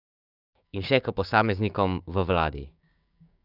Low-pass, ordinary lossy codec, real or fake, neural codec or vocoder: 5.4 kHz; none; fake; codec, 16 kHz, 6 kbps, DAC